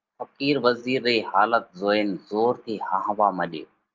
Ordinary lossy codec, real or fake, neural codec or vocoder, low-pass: Opus, 32 kbps; real; none; 7.2 kHz